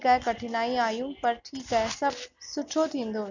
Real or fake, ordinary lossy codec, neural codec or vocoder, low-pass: real; none; none; 7.2 kHz